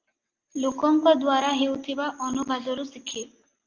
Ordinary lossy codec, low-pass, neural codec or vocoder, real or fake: Opus, 32 kbps; 7.2 kHz; none; real